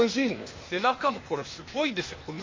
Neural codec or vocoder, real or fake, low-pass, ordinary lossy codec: codec, 16 kHz, 0.8 kbps, ZipCodec; fake; 7.2 kHz; MP3, 32 kbps